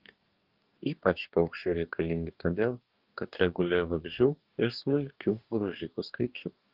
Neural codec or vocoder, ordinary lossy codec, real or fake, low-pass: codec, 44.1 kHz, 2.6 kbps, DAC; Opus, 32 kbps; fake; 5.4 kHz